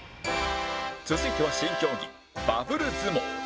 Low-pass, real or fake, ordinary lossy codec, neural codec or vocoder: none; real; none; none